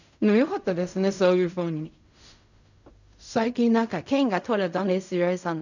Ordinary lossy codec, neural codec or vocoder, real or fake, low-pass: none; codec, 16 kHz in and 24 kHz out, 0.4 kbps, LongCat-Audio-Codec, fine tuned four codebook decoder; fake; 7.2 kHz